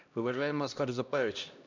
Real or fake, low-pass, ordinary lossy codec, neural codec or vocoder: fake; 7.2 kHz; none; codec, 16 kHz, 1 kbps, X-Codec, HuBERT features, trained on LibriSpeech